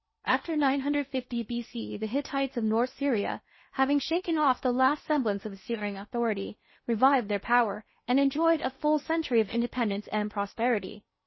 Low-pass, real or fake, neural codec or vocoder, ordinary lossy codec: 7.2 kHz; fake; codec, 16 kHz in and 24 kHz out, 0.8 kbps, FocalCodec, streaming, 65536 codes; MP3, 24 kbps